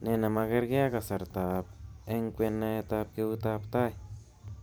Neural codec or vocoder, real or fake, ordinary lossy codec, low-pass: vocoder, 44.1 kHz, 128 mel bands every 512 samples, BigVGAN v2; fake; none; none